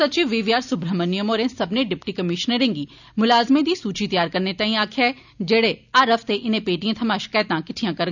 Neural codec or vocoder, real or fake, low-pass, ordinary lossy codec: none; real; 7.2 kHz; none